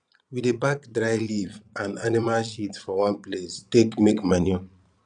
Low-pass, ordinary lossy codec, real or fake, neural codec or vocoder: 9.9 kHz; none; fake; vocoder, 22.05 kHz, 80 mel bands, Vocos